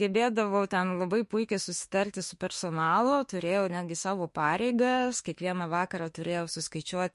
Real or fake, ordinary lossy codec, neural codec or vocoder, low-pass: fake; MP3, 48 kbps; autoencoder, 48 kHz, 32 numbers a frame, DAC-VAE, trained on Japanese speech; 14.4 kHz